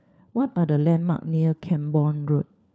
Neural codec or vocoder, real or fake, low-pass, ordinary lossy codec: codec, 16 kHz, 4 kbps, FunCodec, trained on LibriTTS, 50 frames a second; fake; none; none